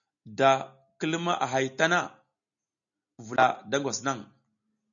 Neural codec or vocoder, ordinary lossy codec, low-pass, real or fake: none; MP3, 96 kbps; 7.2 kHz; real